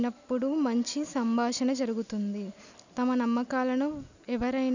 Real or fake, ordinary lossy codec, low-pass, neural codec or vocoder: real; none; 7.2 kHz; none